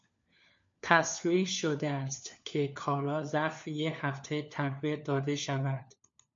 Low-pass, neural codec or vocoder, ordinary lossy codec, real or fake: 7.2 kHz; codec, 16 kHz, 4 kbps, FunCodec, trained on LibriTTS, 50 frames a second; MP3, 48 kbps; fake